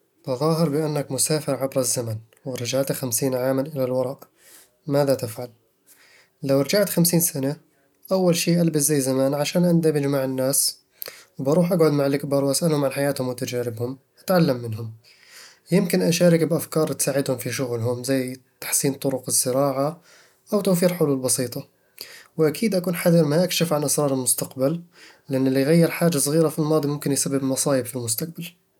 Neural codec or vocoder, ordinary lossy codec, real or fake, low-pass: none; none; real; 19.8 kHz